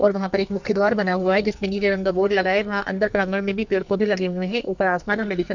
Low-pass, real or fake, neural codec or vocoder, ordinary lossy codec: 7.2 kHz; fake; codec, 44.1 kHz, 2.6 kbps, SNAC; none